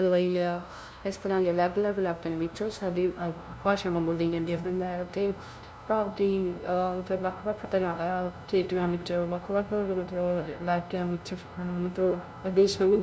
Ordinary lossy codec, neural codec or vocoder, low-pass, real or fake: none; codec, 16 kHz, 0.5 kbps, FunCodec, trained on LibriTTS, 25 frames a second; none; fake